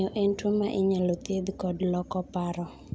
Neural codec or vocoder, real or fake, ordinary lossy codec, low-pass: none; real; none; none